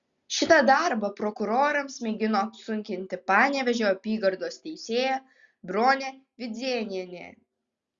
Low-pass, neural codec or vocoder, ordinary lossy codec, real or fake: 7.2 kHz; none; Opus, 64 kbps; real